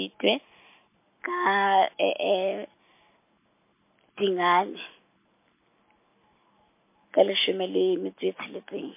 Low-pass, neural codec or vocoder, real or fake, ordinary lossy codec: 3.6 kHz; none; real; MP3, 24 kbps